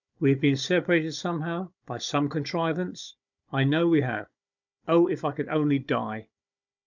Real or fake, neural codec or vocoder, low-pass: fake; codec, 16 kHz, 16 kbps, FunCodec, trained on Chinese and English, 50 frames a second; 7.2 kHz